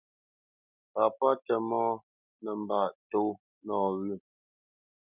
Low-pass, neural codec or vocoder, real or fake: 3.6 kHz; none; real